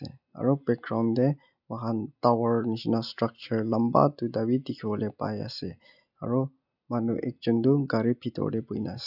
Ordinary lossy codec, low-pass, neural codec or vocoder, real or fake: none; 5.4 kHz; none; real